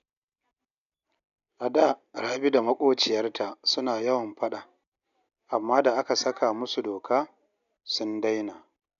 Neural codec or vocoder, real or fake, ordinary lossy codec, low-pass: none; real; none; 7.2 kHz